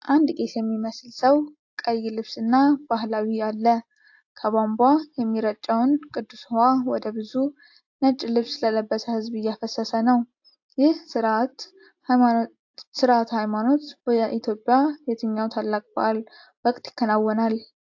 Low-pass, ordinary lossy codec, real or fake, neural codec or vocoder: 7.2 kHz; AAC, 48 kbps; real; none